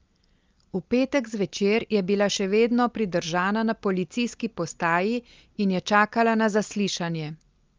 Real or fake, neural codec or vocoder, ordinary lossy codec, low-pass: real; none; Opus, 24 kbps; 7.2 kHz